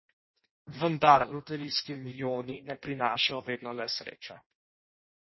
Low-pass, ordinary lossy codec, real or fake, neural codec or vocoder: 7.2 kHz; MP3, 24 kbps; fake; codec, 16 kHz in and 24 kHz out, 0.6 kbps, FireRedTTS-2 codec